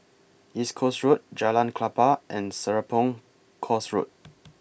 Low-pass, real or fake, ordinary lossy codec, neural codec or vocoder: none; real; none; none